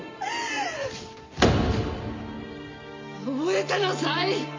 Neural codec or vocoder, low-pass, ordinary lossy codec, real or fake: none; 7.2 kHz; MP3, 48 kbps; real